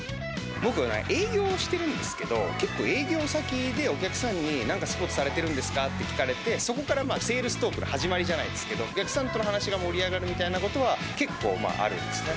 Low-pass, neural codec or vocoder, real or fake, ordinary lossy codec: none; none; real; none